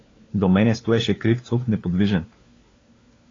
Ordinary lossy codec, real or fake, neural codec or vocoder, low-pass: AAC, 32 kbps; fake; codec, 16 kHz, 4 kbps, FunCodec, trained on LibriTTS, 50 frames a second; 7.2 kHz